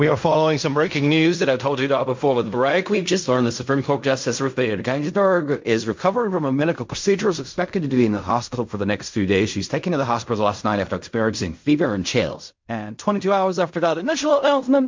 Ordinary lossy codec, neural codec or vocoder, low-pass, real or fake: MP3, 48 kbps; codec, 16 kHz in and 24 kHz out, 0.4 kbps, LongCat-Audio-Codec, fine tuned four codebook decoder; 7.2 kHz; fake